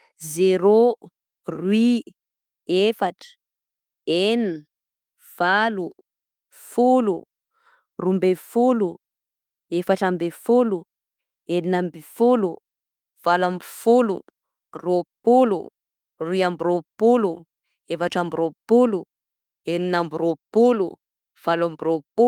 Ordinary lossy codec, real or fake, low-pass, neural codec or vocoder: Opus, 32 kbps; fake; 19.8 kHz; autoencoder, 48 kHz, 32 numbers a frame, DAC-VAE, trained on Japanese speech